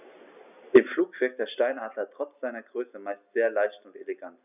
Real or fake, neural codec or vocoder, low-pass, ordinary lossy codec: real; none; 3.6 kHz; none